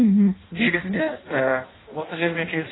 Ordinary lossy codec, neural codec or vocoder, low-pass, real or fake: AAC, 16 kbps; codec, 16 kHz in and 24 kHz out, 0.6 kbps, FireRedTTS-2 codec; 7.2 kHz; fake